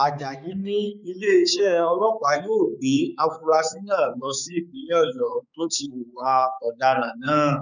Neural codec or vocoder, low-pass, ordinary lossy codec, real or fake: codec, 16 kHz, 4 kbps, X-Codec, HuBERT features, trained on balanced general audio; 7.2 kHz; none; fake